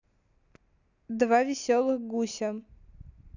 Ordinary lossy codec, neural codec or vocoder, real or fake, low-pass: none; none; real; 7.2 kHz